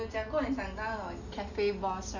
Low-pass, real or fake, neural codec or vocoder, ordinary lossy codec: 7.2 kHz; real; none; none